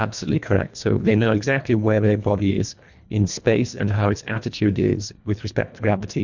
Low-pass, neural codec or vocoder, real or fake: 7.2 kHz; codec, 24 kHz, 1.5 kbps, HILCodec; fake